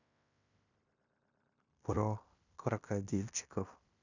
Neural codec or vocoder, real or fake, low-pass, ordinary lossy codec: codec, 16 kHz in and 24 kHz out, 0.9 kbps, LongCat-Audio-Codec, fine tuned four codebook decoder; fake; 7.2 kHz; none